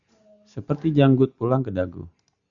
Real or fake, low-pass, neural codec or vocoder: real; 7.2 kHz; none